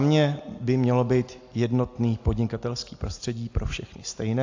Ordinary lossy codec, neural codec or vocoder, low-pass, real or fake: AAC, 48 kbps; none; 7.2 kHz; real